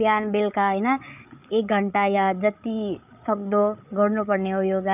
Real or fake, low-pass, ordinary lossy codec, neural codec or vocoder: fake; 3.6 kHz; none; codec, 16 kHz, 8 kbps, FreqCodec, larger model